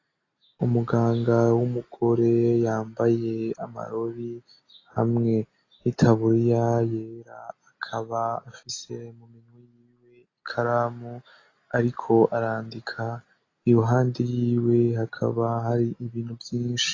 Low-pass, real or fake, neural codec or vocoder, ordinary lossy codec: 7.2 kHz; real; none; AAC, 32 kbps